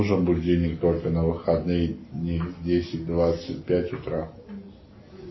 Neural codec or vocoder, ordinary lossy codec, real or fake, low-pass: none; MP3, 24 kbps; real; 7.2 kHz